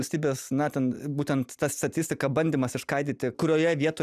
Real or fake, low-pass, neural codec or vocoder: real; 14.4 kHz; none